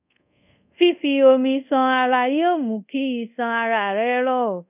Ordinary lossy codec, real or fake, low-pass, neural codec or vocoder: none; fake; 3.6 kHz; codec, 24 kHz, 0.5 kbps, DualCodec